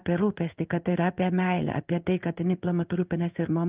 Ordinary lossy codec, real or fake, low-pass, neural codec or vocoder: Opus, 24 kbps; fake; 3.6 kHz; codec, 16 kHz in and 24 kHz out, 1 kbps, XY-Tokenizer